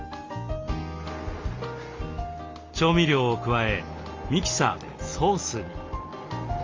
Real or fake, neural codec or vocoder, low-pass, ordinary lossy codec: real; none; 7.2 kHz; Opus, 32 kbps